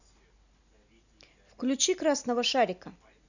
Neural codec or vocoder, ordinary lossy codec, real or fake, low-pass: none; none; real; 7.2 kHz